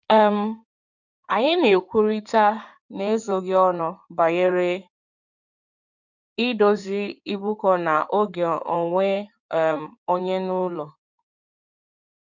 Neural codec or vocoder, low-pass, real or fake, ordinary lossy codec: codec, 16 kHz in and 24 kHz out, 2.2 kbps, FireRedTTS-2 codec; 7.2 kHz; fake; none